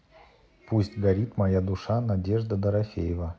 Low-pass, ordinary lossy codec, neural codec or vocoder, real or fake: none; none; none; real